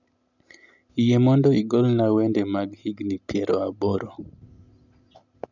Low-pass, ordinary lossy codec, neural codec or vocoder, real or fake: 7.2 kHz; none; none; real